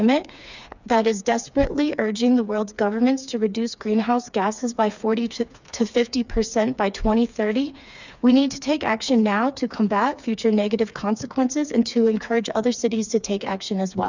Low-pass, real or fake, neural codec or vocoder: 7.2 kHz; fake; codec, 16 kHz, 4 kbps, FreqCodec, smaller model